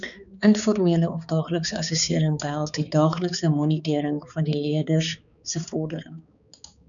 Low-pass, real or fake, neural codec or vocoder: 7.2 kHz; fake; codec, 16 kHz, 4 kbps, X-Codec, HuBERT features, trained on balanced general audio